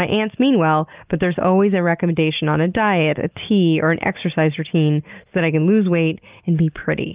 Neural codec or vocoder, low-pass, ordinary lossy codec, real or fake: none; 3.6 kHz; Opus, 24 kbps; real